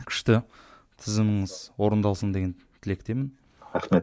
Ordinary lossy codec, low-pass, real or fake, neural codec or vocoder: none; none; real; none